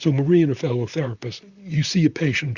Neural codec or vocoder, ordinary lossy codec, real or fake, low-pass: vocoder, 44.1 kHz, 128 mel bands, Pupu-Vocoder; Opus, 64 kbps; fake; 7.2 kHz